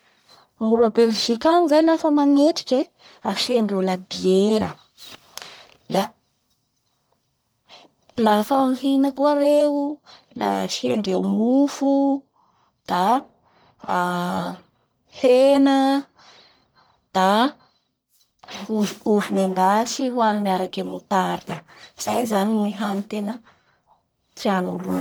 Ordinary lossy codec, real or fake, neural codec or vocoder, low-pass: none; fake; codec, 44.1 kHz, 1.7 kbps, Pupu-Codec; none